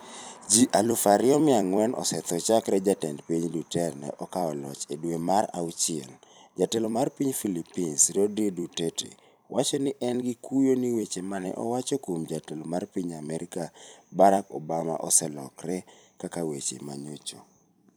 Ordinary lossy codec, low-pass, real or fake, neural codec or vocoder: none; none; fake; vocoder, 44.1 kHz, 128 mel bands every 256 samples, BigVGAN v2